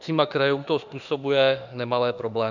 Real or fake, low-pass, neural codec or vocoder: fake; 7.2 kHz; autoencoder, 48 kHz, 32 numbers a frame, DAC-VAE, trained on Japanese speech